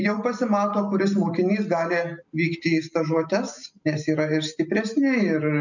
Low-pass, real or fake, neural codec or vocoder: 7.2 kHz; real; none